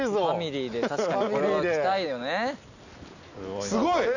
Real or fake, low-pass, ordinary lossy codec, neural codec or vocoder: real; 7.2 kHz; none; none